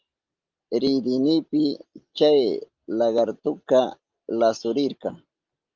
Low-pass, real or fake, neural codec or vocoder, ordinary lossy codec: 7.2 kHz; real; none; Opus, 32 kbps